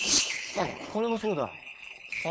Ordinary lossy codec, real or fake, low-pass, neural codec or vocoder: none; fake; none; codec, 16 kHz, 4.8 kbps, FACodec